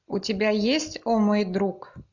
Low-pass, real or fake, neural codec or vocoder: 7.2 kHz; real; none